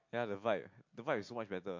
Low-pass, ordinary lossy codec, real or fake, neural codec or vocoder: 7.2 kHz; MP3, 48 kbps; real; none